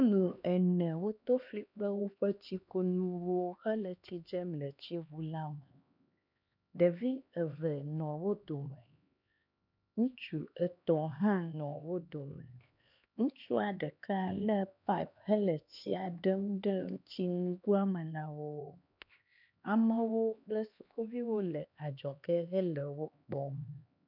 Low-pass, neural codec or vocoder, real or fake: 5.4 kHz; codec, 16 kHz, 2 kbps, X-Codec, HuBERT features, trained on LibriSpeech; fake